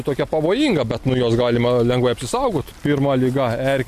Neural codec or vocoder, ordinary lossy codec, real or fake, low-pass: none; MP3, 64 kbps; real; 14.4 kHz